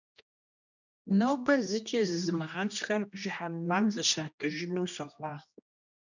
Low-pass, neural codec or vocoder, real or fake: 7.2 kHz; codec, 16 kHz, 1 kbps, X-Codec, HuBERT features, trained on general audio; fake